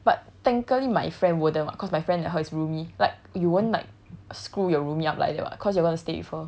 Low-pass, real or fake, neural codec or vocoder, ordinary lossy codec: none; real; none; none